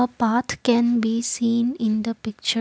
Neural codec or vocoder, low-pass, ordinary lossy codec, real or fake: none; none; none; real